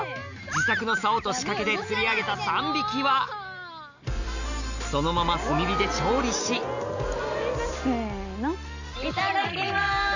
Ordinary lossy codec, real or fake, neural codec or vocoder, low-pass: none; real; none; 7.2 kHz